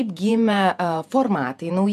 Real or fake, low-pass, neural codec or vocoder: fake; 14.4 kHz; vocoder, 48 kHz, 128 mel bands, Vocos